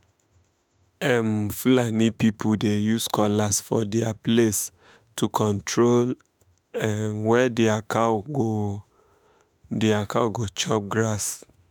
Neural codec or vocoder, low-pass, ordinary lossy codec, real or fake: autoencoder, 48 kHz, 32 numbers a frame, DAC-VAE, trained on Japanese speech; none; none; fake